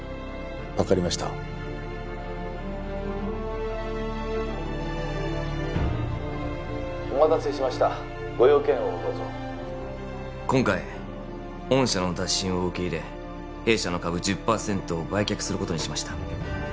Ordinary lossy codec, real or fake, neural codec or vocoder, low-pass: none; real; none; none